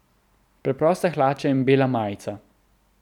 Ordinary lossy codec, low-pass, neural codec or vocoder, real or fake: none; 19.8 kHz; none; real